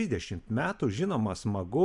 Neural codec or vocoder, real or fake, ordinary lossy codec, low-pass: none; real; MP3, 96 kbps; 10.8 kHz